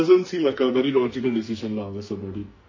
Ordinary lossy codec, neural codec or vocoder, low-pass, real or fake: MP3, 32 kbps; codec, 44.1 kHz, 2.6 kbps, SNAC; 7.2 kHz; fake